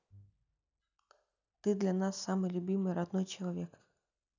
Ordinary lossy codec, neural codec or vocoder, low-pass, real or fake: none; none; 7.2 kHz; real